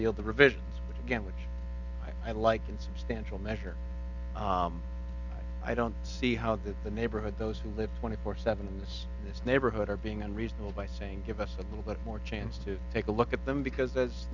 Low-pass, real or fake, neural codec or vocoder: 7.2 kHz; real; none